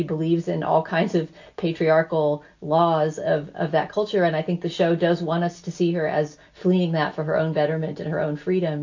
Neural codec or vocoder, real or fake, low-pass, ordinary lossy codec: none; real; 7.2 kHz; AAC, 48 kbps